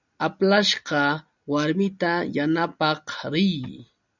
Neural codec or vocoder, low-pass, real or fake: none; 7.2 kHz; real